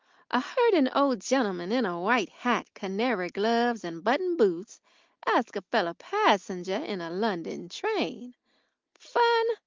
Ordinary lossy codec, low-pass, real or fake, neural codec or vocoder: Opus, 32 kbps; 7.2 kHz; real; none